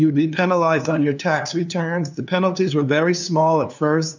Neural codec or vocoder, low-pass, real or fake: codec, 16 kHz, 2 kbps, FunCodec, trained on LibriTTS, 25 frames a second; 7.2 kHz; fake